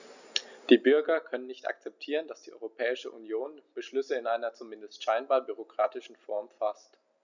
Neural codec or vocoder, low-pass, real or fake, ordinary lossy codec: none; 7.2 kHz; real; none